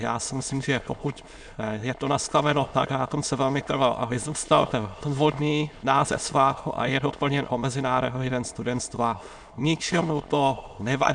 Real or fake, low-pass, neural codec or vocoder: fake; 9.9 kHz; autoencoder, 22.05 kHz, a latent of 192 numbers a frame, VITS, trained on many speakers